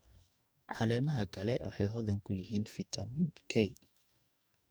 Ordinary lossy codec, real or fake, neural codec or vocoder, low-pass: none; fake; codec, 44.1 kHz, 2.6 kbps, DAC; none